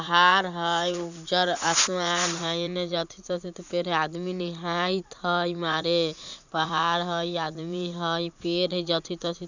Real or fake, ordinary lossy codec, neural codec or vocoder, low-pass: fake; none; vocoder, 44.1 kHz, 80 mel bands, Vocos; 7.2 kHz